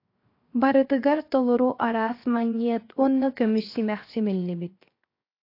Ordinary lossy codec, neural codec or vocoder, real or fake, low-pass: AAC, 32 kbps; codec, 16 kHz, 0.7 kbps, FocalCodec; fake; 5.4 kHz